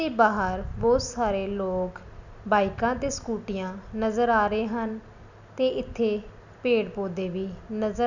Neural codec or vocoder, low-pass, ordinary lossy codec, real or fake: none; 7.2 kHz; none; real